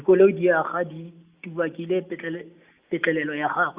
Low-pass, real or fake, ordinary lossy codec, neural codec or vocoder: 3.6 kHz; real; Opus, 64 kbps; none